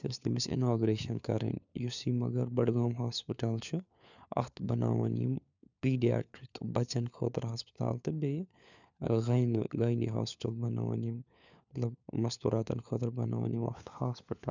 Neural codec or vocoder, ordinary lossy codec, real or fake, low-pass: codec, 16 kHz, 4 kbps, FunCodec, trained on LibriTTS, 50 frames a second; none; fake; 7.2 kHz